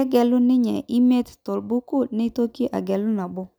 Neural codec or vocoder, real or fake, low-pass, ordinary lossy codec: none; real; none; none